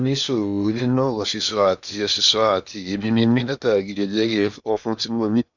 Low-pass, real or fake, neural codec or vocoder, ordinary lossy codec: 7.2 kHz; fake; codec, 16 kHz in and 24 kHz out, 0.8 kbps, FocalCodec, streaming, 65536 codes; none